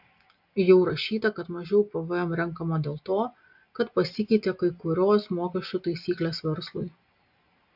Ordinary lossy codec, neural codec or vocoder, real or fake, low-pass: AAC, 48 kbps; none; real; 5.4 kHz